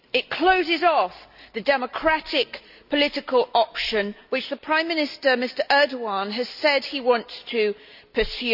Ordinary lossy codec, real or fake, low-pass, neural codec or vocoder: none; real; 5.4 kHz; none